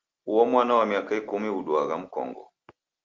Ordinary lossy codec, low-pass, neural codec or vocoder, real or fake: Opus, 32 kbps; 7.2 kHz; none; real